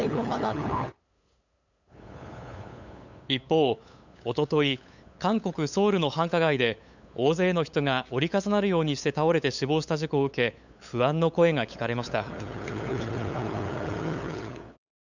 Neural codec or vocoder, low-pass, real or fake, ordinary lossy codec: codec, 16 kHz, 8 kbps, FunCodec, trained on LibriTTS, 25 frames a second; 7.2 kHz; fake; none